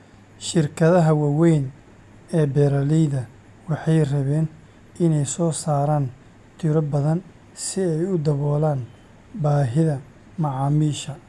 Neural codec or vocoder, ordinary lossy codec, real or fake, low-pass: none; none; real; none